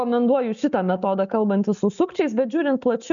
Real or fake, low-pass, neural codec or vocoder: real; 7.2 kHz; none